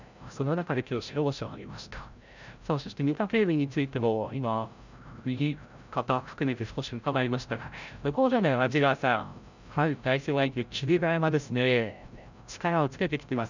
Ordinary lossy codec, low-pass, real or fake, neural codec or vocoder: none; 7.2 kHz; fake; codec, 16 kHz, 0.5 kbps, FreqCodec, larger model